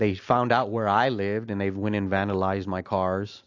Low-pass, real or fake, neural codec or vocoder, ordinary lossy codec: 7.2 kHz; real; none; AAC, 48 kbps